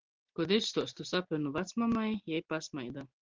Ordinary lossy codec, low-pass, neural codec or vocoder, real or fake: Opus, 16 kbps; 7.2 kHz; none; real